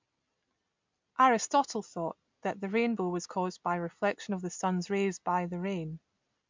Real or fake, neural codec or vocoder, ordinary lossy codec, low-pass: real; none; MP3, 64 kbps; 7.2 kHz